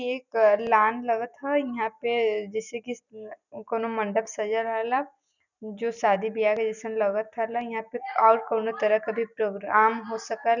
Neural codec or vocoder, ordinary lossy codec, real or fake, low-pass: none; none; real; 7.2 kHz